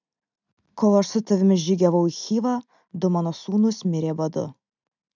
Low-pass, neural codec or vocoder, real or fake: 7.2 kHz; none; real